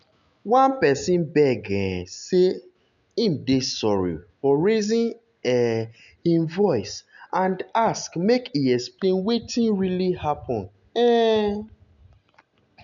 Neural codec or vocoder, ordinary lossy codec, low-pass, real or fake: none; none; 7.2 kHz; real